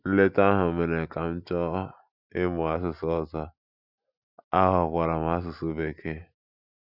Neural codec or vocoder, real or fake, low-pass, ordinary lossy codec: none; real; 5.4 kHz; none